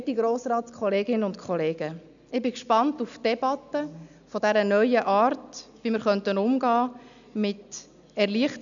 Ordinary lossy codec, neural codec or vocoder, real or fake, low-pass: none; none; real; 7.2 kHz